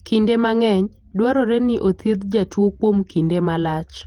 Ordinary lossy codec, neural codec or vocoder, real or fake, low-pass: Opus, 24 kbps; vocoder, 48 kHz, 128 mel bands, Vocos; fake; 19.8 kHz